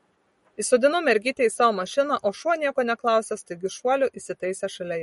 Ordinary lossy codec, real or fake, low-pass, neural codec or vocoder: MP3, 48 kbps; real; 19.8 kHz; none